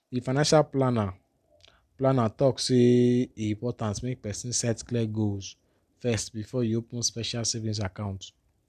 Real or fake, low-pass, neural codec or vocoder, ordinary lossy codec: real; 14.4 kHz; none; none